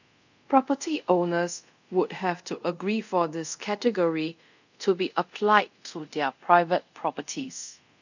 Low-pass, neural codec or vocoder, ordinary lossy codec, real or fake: 7.2 kHz; codec, 24 kHz, 0.5 kbps, DualCodec; none; fake